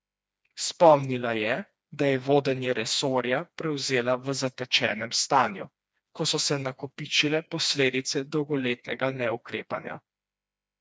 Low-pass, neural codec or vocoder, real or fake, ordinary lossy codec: none; codec, 16 kHz, 2 kbps, FreqCodec, smaller model; fake; none